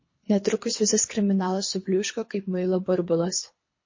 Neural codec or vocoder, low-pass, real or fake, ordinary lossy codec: codec, 24 kHz, 3 kbps, HILCodec; 7.2 kHz; fake; MP3, 32 kbps